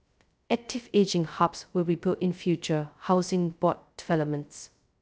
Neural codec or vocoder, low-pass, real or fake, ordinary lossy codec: codec, 16 kHz, 0.2 kbps, FocalCodec; none; fake; none